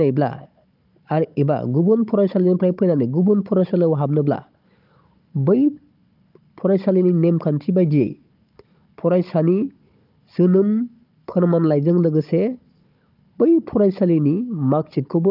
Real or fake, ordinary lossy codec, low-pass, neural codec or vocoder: fake; Opus, 24 kbps; 5.4 kHz; codec, 16 kHz, 16 kbps, FunCodec, trained on Chinese and English, 50 frames a second